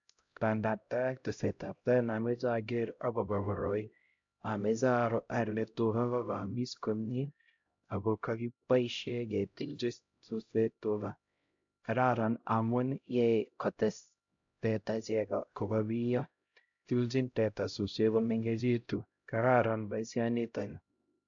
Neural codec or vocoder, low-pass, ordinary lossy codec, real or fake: codec, 16 kHz, 0.5 kbps, X-Codec, HuBERT features, trained on LibriSpeech; 7.2 kHz; AAC, 64 kbps; fake